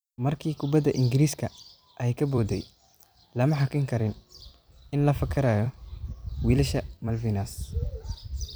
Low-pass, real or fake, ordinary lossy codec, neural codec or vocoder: none; fake; none; vocoder, 44.1 kHz, 128 mel bands every 256 samples, BigVGAN v2